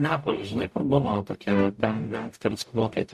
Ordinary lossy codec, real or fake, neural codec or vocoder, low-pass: MP3, 64 kbps; fake; codec, 44.1 kHz, 0.9 kbps, DAC; 14.4 kHz